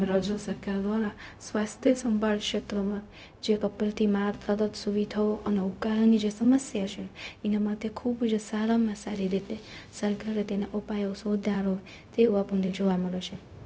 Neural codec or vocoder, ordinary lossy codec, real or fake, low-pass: codec, 16 kHz, 0.4 kbps, LongCat-Audio-Codec; none; fake; none